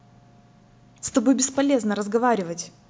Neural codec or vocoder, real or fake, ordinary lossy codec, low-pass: none; real; none; none